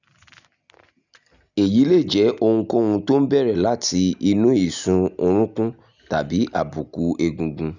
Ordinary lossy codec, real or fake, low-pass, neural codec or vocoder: none; real; 7.2 kHz; none